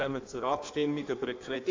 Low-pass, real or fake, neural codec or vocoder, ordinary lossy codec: 7.2 kHz; fake; codec, 16 kHz in and 24 kHz out, 1.1 kbps, FireRedTTS-2 codec; AAC, 48 kbps